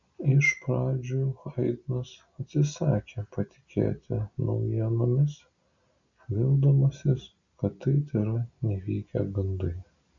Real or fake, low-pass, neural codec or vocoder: real; 7.2 kHz; none